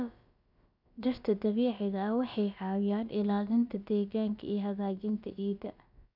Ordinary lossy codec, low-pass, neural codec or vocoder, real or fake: none; 5.4 kHz; codec, 16 kHz, about 1 kbps, DyCAST, with the encoder's durations; fake